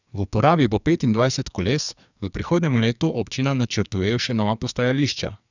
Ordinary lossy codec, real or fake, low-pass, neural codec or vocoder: none; fake; 7.2 kHz; codec, 44.1 kHz, 2.6 kbps, SNAC